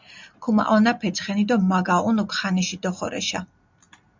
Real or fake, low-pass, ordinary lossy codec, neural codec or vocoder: real; 7.2 kHz; MP3, 64 kbps; none